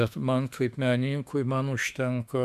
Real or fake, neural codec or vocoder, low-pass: fake; autoencoder, 48 kHz, 32 numbers a frame, DAC-VAE, trained on Japanese speech; 14.4 kHz